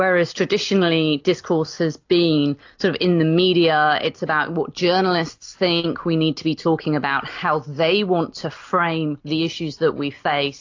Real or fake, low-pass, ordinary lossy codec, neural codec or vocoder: real; 7.2 kHz; AAC, 48 kbps; none